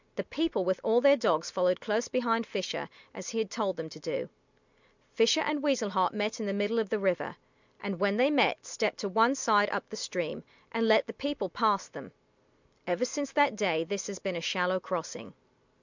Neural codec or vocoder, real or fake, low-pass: none; real; 7.2 kHz